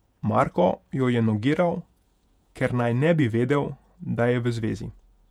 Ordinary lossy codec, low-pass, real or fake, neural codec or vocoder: none; 19.8 kHz; fake; vocoder, 48 kHz, 128 mel bands, Vocos